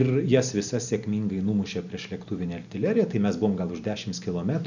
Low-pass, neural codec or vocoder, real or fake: 7.2 kHz; none; real